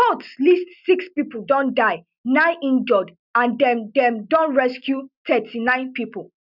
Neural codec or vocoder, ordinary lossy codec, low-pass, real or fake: none; none; 5.4 kHz; real